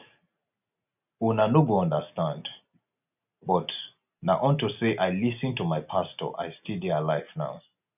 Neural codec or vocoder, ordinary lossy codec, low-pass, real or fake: none; none; 3.6 kHz; real